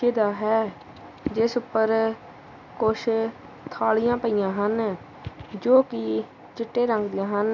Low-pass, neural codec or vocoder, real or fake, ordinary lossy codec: 7.2 kHz; none; real; none